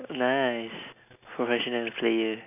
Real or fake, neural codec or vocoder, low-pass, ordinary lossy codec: real; none; 3.6 kHz; none